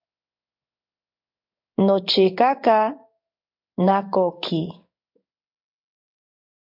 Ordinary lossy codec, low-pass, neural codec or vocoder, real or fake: MP3, 48 kbps; 5.4 kHz; codec, 16 kHz in and 24 kHz out, 1 kbps, XY-Tokenizer; fake